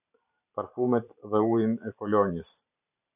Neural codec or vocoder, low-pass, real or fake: vocoder, 44.1 kHz, 128 mel bands every 512 samples, BigVGAN v2; 3.6 kHz; fake